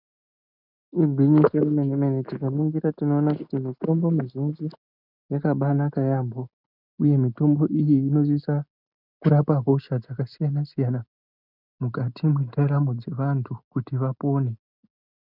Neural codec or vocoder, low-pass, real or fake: none; 5.4 kHz; real